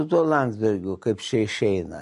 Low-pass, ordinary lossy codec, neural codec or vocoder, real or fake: 14.4 kHz; MP3, 48 kbps; none; real